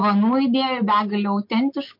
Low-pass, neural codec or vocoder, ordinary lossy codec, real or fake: 5.4 kHz; none; MP3, 32 kbps; real